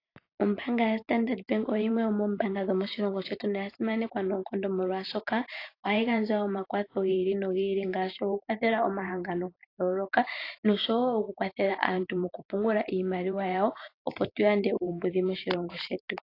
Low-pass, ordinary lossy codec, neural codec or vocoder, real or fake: 5.4 kHz; AAC, 32 kbps; vocoder, 44.1 kHz, 128 mel bands every 512 samples, BigVGAN v2; fake